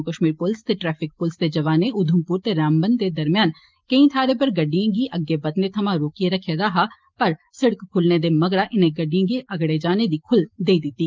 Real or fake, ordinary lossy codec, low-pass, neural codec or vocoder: real; Opus, 32 kbps; 7.2 kHz; none